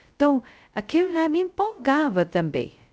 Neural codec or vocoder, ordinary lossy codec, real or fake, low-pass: codec, 16 kHz, 0.2 kbps, FocalCodec; none; fake; none